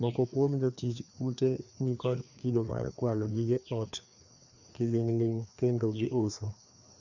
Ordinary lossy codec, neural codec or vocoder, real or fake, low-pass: none; codec, 16 kHz, 2 kbps, FreqCodec, larger model; fake; 7.2 kHz